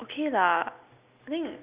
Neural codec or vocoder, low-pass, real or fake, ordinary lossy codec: none; 3.6 kHz; real; Opus, 64 kbps